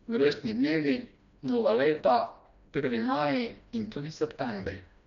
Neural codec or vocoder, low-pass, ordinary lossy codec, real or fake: codec, 16 kHz, 1 kbps, FreqCodec, smaller model; 7.2 kHz; none; fake